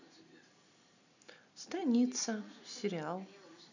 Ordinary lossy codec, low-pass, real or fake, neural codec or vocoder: none; 7.2 kHz; real; none